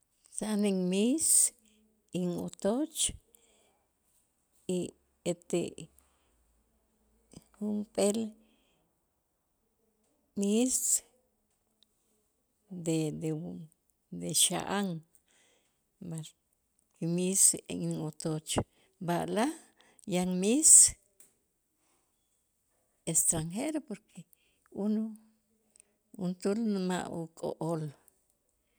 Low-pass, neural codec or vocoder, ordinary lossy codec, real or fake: none; none; none; real